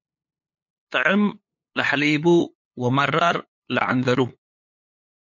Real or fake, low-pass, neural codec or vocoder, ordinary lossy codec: fake; 7.2 kHz; codec, 16 kHz, 8 kbps, FunCodec, trained on LibriTTS, 25 frames a second; MP3, 48 kbps